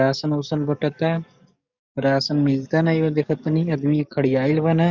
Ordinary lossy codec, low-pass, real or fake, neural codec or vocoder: Opus, 64 kbps; 7.2 kHz; fake; codec, 44.1 kHz, 7.8 kbps, DAC